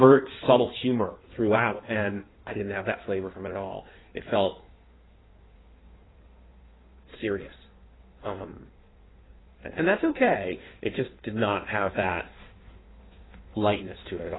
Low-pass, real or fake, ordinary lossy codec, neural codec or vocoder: 7.2 kHz; fake; AAC, 16 kbps; codec, 16 kHz in and 24 kHz out, 1.1 kbps, FireRedTTS-2 codec